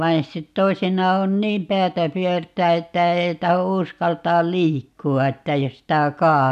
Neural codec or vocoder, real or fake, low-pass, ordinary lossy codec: none; real; 14.4 kHz; none